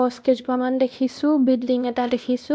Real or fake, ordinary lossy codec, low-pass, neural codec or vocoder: fake; none; none; codec, 16 kHz, 1 kbps, X-Codec, WavLM features, trained on Multilingual LibriSpeech